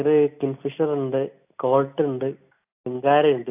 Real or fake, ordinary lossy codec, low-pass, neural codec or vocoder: real; AAC, 32 kbps; 3.6 kHz; none